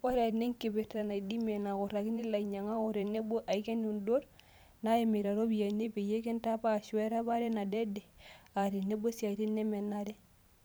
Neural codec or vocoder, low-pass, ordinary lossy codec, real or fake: vocoder, 44.1 kHz, 128 mel bands every 512 samples, BigVGAN v2; none; none; fake